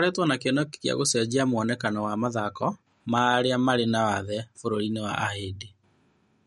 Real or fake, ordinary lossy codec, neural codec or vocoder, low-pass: real; MP3, 48 kbps; none; 19.8 kHz